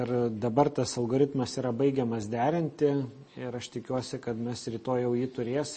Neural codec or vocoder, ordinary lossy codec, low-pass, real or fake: none; MP3, 32 kbps; 9.9 kHz; real